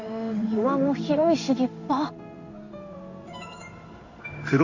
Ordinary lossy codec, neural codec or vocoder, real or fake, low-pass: none; codec, 16 kHz in and 24 kHz out, 1 kbps, XY-Tokenizer; fake; 7.2 kHz